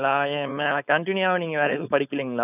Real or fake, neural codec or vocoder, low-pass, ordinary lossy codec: fake; codec, 16 kHz, 4.8 kbps, FACodec; 3.6 kHz; none